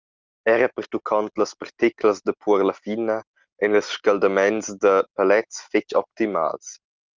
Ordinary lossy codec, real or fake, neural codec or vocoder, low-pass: Opus, 32 kbps; real; none; 7.2 kHz